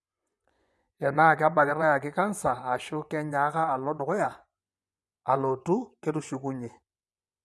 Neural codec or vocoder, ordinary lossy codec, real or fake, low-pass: vocoder, 24 kHz, 100 mel bands, Vocos; none; fake; none